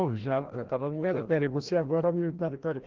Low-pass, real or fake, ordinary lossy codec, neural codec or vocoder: 7.2 kHz; fake; Opus, 16 kbps; codec, 16 kHz, 1 kbps, FreqCodec, larger model